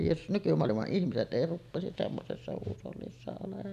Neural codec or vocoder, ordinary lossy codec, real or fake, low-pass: none; none; real; 14.4 kHz